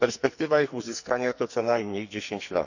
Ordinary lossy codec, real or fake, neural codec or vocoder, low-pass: none; fake; codec, 44.1 kHz, 2.6 kbps, SNAC; 7.2 kHz